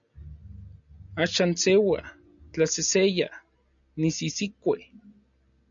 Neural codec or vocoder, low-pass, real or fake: none; 7.2 kHz; real